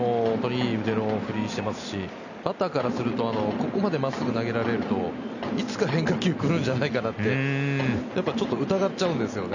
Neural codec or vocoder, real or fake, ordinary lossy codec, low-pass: none; real; none; 7.2 kHz